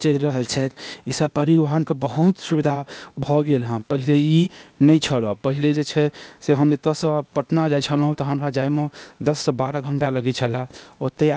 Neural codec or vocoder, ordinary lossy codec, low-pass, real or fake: codec, 16 kHz, 0.8 kbps, ZipCodec; none; none; fake